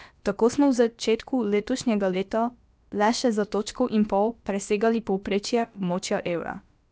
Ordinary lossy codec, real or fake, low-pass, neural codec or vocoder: none; fake; none; codec, 16 kHz, about 1 kbps, DyCAST, with the encoder's durations